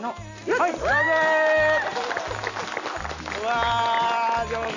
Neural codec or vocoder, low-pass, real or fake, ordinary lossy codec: none; 7.2 kHz; real; none